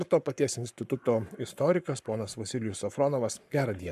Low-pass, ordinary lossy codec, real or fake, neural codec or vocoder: 14.4 kHz; MP3, 96 kbps; fake; codec, 44.1 kHz, 7.8 kbps, Pupu-Codec